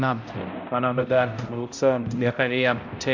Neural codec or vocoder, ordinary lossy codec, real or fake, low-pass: codec, 16 kHz, 0.5 kbps, X-Codec, HuBERT features, trained on balanced general audio; MP3, 48 kbps; fake; 7.2 kHz